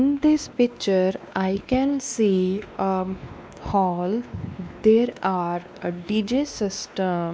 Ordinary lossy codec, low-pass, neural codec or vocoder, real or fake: none; none; codec, 16 kHz, 2 kbps, X-Codec, WavLM features, trained on Multilingual LibriSpeech; fake